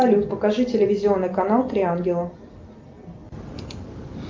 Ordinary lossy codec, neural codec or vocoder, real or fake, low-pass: Opus, 24 kbps; none; real; 7.2 kHz